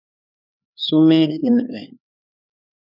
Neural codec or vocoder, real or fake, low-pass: codec, 16 kHz, 4 kbps, X-Codec, HuBERT features, trained on LibriSpeech; fake; 5.4 kHz